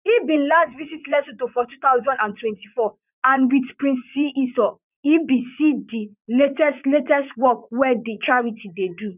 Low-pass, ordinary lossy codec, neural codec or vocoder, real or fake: 3.6 kHz; none; vocoder, 44.1 kHz, 80 mel bands, Vocos; fake